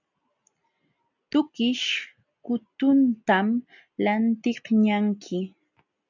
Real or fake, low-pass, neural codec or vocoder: real; 7.2 kHz; none